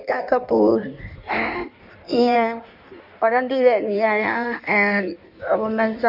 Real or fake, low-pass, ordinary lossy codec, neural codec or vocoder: fake; 5.4 kHz; MP3, 48 kbps; codec, 16 kHz in and 24 kHz out, 1.1 kbps, FireRedTTS-2 codec